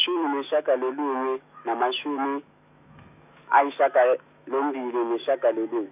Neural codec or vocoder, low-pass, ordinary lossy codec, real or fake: autoencoder, 48 kHz, 128 numbers a frame, DAC-VAE, trained on Japanese speech; 3.6 kHz; none; fake